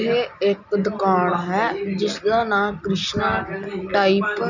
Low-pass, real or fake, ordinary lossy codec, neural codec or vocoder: 7.2 kHz; real; none; none